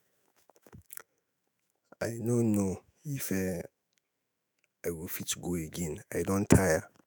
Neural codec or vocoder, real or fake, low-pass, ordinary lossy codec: autoencoder, 48 kHz, 128 numbers a frame, DAC-VAE, trained on Japanese speech; fake; none; none